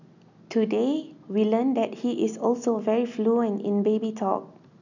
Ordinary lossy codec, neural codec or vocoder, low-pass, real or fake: none; none; 7.2 kHz; real